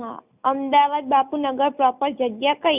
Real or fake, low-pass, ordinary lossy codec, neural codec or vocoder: real; 3.6 kHz; none; none